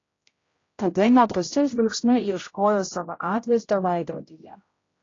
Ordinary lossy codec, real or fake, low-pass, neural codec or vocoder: AAC, 32 kbps; fake; 7.2 kHz; codec, 16 kHz, 0.5 kbps, X-Codec, HuBERT features, trained on general audio